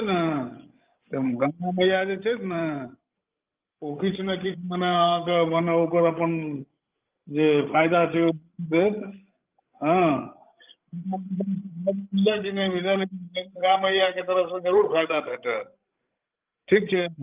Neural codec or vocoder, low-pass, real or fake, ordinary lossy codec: codec, 16 kHz, 16 kbps, FreqCodec, larger model; 3.6 kHz; fake; Opus, 24 kbps